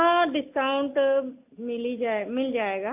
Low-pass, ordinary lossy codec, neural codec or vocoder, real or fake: 3.6 kHz; AAC, 32 kbps; none; real